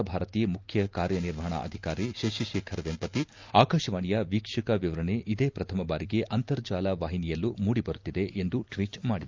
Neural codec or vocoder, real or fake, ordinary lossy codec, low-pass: none; real; Opus, 24 kbps; 7.2 kHz